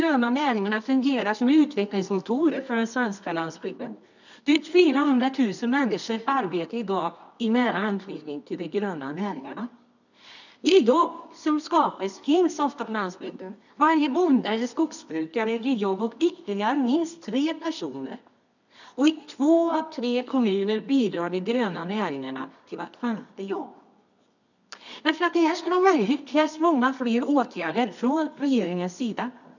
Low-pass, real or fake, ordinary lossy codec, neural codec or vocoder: 7.2 kHz; fake; none; codec, 24 kHz, 0.9 kbps, WavTokenizer, medium music audio release